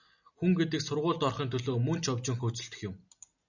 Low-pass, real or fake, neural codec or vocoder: 7.2 kHz; real; none